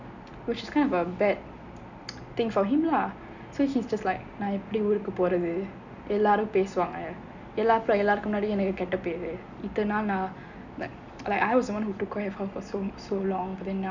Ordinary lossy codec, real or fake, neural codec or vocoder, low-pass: none; real; none; 7.2 kHz